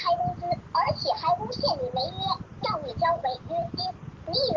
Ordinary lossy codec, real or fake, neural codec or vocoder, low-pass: Opus, 16 kbps; real; none; 7.2 kHz